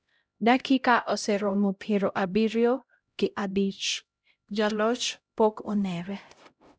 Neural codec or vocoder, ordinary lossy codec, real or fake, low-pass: codec, 16 kHz, 0.5 kbps, X-Codec, HuBERT features, trained on LibriSpeech; none; fake; none